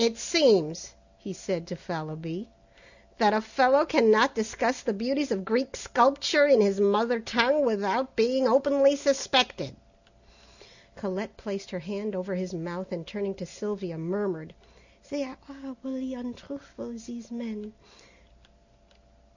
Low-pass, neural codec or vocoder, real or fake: 7.2 kHz; none; real